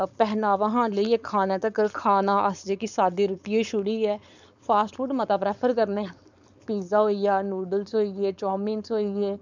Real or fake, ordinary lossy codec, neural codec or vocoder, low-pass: fake; none; codec, 16 kHz, 4.8 kbps, FACodec; 7.2 kHz